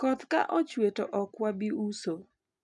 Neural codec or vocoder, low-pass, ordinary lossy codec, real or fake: none; 10.8 kHz; MP3, 96 kbps; real